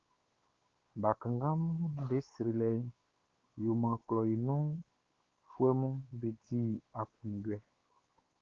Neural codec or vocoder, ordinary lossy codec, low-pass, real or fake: codec, 16 kHz, 4 kbps, X-Codec, WavLM features, trained on Multilingual LibriSpeech; Opus, 16 kbps; 7.2 kHz; fake